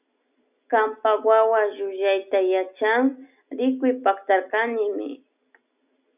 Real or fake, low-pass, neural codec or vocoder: real; 3.6 kHz; none